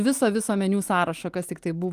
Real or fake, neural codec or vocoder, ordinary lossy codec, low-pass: real; none; Opus, 24 kbps; 14.4 kHz